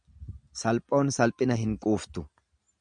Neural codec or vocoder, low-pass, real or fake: none; 9.9 kHz; real